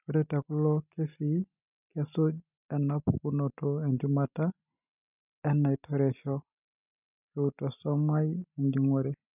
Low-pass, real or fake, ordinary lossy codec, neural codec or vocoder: 3.6 kHz; real; none; none